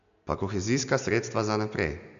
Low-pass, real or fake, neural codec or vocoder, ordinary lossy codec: 7.2 kHz; real; none; none